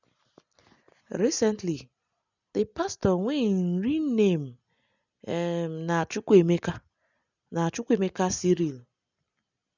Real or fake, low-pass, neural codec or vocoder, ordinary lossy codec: real; 7.2 kHz; none; none